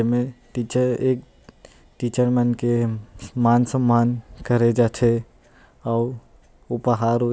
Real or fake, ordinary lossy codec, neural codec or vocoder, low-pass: real; none; none; none